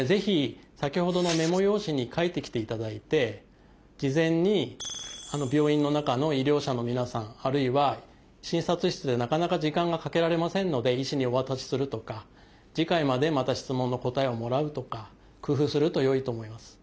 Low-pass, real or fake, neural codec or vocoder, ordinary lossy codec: none; real; none; none